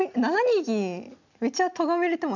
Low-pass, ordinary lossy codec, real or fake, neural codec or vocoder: 7.2 kHz; none; real; none